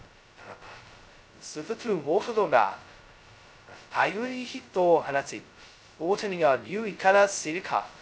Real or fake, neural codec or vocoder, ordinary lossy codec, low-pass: fake; codec, 16 kHz, 0.2 kbps, FocalCodec; none; none